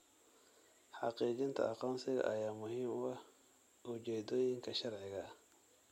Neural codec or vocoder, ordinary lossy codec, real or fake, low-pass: none; MP3, 64 kbps; real; 19.8 kHz